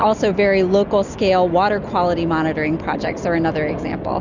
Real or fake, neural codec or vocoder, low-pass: real; none; 7.2 kHz